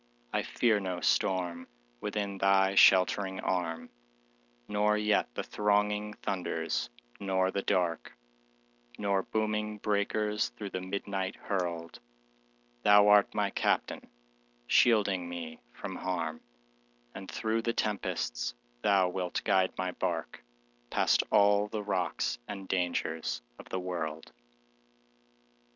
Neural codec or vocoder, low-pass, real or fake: none; 7.2 kHz; real